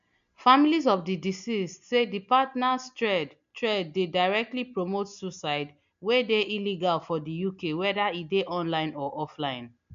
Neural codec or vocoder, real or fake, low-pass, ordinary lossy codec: none; real; 7.2 kHz; MP3, 64 kbps